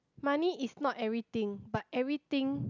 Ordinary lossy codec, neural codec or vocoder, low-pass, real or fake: none; none; 7.2 kHz; real